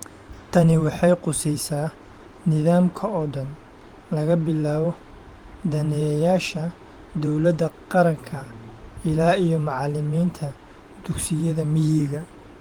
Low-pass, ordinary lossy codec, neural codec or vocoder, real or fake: 19.8 kHz; Opus, 24 kbps; vocoder, 44.1 kHz, 128 mel bands every 512 samples, BigVGAN v2; fake